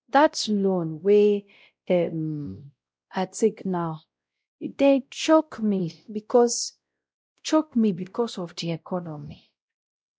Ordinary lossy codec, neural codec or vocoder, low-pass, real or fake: none; codec, 16 kHz, 0.5 kbps, X-Codec, WavLM features, trained on Multilingual LibriSpeech; none; fake